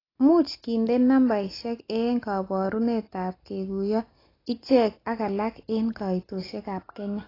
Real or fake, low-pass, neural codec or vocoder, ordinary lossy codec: real; 5.4 kHz; none; AAC, 24 kbps